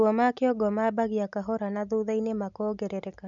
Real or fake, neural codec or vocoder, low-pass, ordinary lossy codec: real; none; 7.2 kHz; none